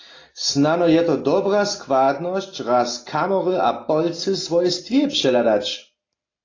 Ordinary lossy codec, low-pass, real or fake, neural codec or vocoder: AAC, 32 kbps; 7.2 kHz; real; none